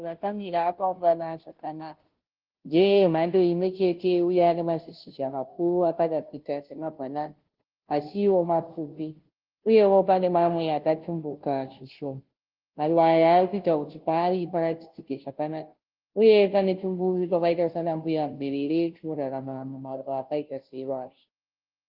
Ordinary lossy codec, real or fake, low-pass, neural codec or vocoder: Opus, 16 kbps; fake; 5.4 kHz; codec, 16 kHz, 0.5 kbps, FunCodec, trained on Chinese and English, 25 frames a second